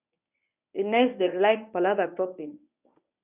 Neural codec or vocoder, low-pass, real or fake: codec, 24 kHz, 0.9 kbps, WavTokenizer, medium speech release version 1; 3.6 kHz; fake